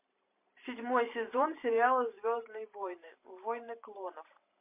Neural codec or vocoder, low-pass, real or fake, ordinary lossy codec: vocoder, 44.1 kHz, 128 mel bands every 512 samples, BigVGAN v2; 3.6 kHz; fake; MP3, 24 kbps